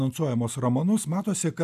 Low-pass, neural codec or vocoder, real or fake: 14.4 kHz; none; real